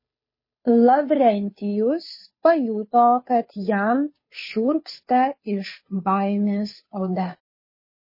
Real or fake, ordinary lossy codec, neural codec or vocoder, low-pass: fake; MP3, 24 kbps; codec, 16 kHz, 2 kbps, FunCodec, trained on Chinese and English, 25 frames a second; 5.4 kHz